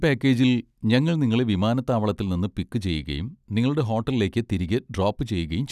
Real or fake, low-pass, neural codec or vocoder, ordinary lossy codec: real; 14.4 kHz; none; none